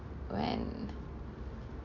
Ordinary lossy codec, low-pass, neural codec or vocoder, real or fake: none; 7.2 kHz; none; real